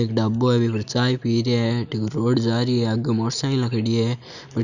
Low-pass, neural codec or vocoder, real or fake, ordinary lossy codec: 7.2 kHz; none; real; none